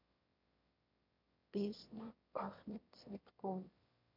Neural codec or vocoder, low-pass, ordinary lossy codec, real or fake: autoencoder, 22.05 kHz, a latent of 192 numbers a frame, VITS, trained on one speaker; 5.4 kHz; MP3, 48 kbps; fake